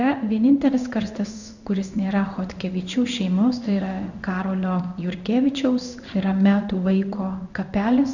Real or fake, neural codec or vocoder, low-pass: fake; codec, 16 kHz in and 24 kHz out, 1 kbps, XY-Tokenizer; 7.2 kHz